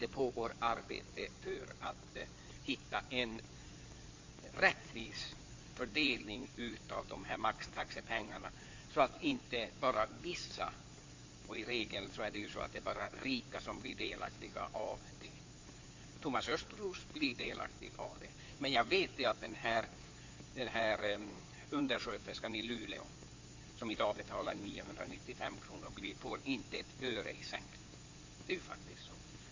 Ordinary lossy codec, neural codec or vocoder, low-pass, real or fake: MP3, 48 kbps; codec, 16 kHz in and 24 kHz out, 2.2 kbps, FireRedTTS-2 codec; 7.2 kHz; fake